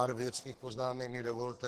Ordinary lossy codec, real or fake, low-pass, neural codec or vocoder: Opus, 16 kbps; fake; 14.4 kHz; codec, 44.1 kHz, 2.6 kbps, SNAC